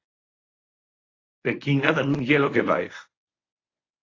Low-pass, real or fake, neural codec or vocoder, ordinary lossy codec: 7.2 kHz; fake; codec, 24 kHz, 0.9 kbps, WavTokenizer, medium speech release version 1; AAC, 32 kbps